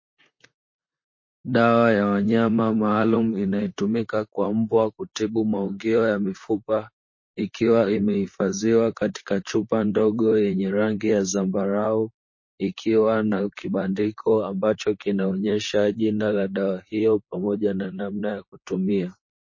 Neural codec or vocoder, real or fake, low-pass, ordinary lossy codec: vocoder, 44.1 kHz, 128 mel bands, Pupu-Vocoder; fake; 7.2 kHz; MP3, 32 kbps